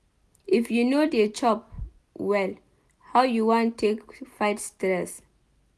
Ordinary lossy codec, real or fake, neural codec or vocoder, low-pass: none; real; none; none